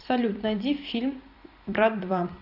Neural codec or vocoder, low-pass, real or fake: vocoder, 44.1 kHz, 128 mel bands every 256 samples, BigVGAN v2; 5.4 kHz; fake